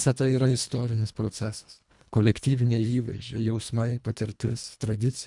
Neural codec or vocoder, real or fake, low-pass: codec, 24 kHz, 1.5 kbps, HILCodec; fake; 10.8 kHz